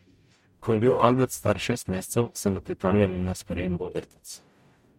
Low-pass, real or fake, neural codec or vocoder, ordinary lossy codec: 19.8 kHz; fake; codec, 44.1 kHz, 0.9 kbps, DAC; MP3, 64 kbps